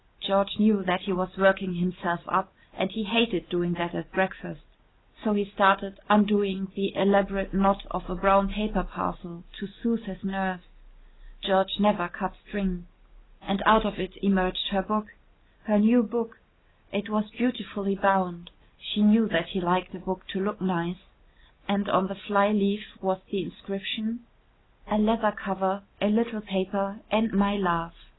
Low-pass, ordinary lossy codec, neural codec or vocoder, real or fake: 7.2 kHz; AAC, 16 kbps; none; real